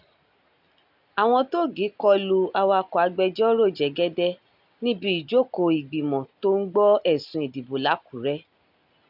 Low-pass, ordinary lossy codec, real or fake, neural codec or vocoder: 5.4 kHz; AAC, 48 kbps; real; none